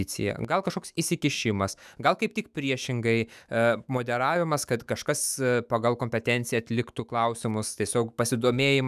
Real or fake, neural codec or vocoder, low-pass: fake; autoencoder, 48 kHz, 128 numbers a frame, DAC-VAE, trained on Japanese speech; 14.4 kHz